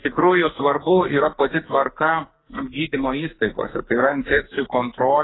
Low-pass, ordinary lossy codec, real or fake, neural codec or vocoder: 7.2 kHz; AAC, 16 kbps; fake; codec, 44.1 kHz, 2.6 kbps, SNAC